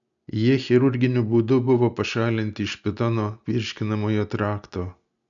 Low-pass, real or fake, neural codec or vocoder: 7.2 kHz; real; none